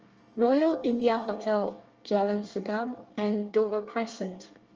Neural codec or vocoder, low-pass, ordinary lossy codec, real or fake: codec, 24 kHz, 1 kbps, SNAC; 7.2 kHz; Opus, 24 kbps; fake